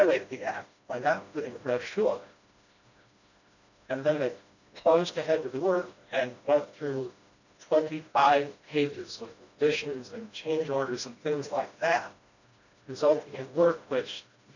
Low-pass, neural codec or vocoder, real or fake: 7.2 kHz; codec, 16 kHz, 1 kbps, FreqCodec, smaller model; fake